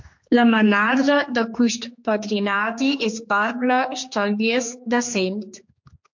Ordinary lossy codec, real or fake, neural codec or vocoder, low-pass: MP3, 48 kbps; fake; codec, 16 kHz, 2 kbps, X-Codec, HuBERT features, trained on general audio; 7.2 kHz